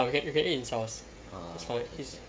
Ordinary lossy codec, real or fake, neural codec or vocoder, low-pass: none; fake; codec, 16 kHz, 16 kbps, FreqCodec, smaller model; none